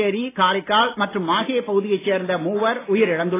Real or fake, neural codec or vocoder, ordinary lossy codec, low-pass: real; none; AAC, 16 kbps; 3.6 kHz